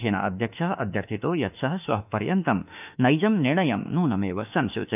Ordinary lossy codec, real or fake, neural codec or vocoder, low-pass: none; fake; autoencoder, 48 kHz, 32 numbers a frame, DAC-VAE, trained on Japanese speech; 3.6 kHz